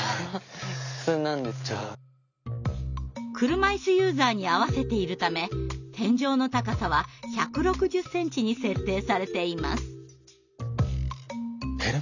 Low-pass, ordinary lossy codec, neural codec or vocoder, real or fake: 7.2 kHz; none; none; real